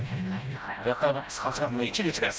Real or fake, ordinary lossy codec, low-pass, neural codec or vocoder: fake; none; none; codec, 16 kHz, 0.5 kbps, FreqCodec, smaller model